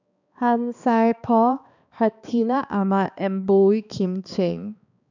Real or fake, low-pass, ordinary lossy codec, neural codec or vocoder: fake; 7.2 kHz; none; codec, 16 kHz, 2 kbps, X-Codec, HuBERT features, trained on balanced general audio